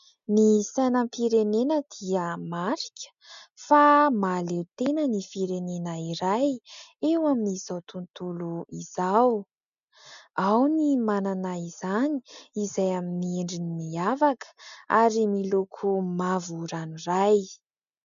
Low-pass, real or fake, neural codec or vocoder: 7.2 kHz; real; none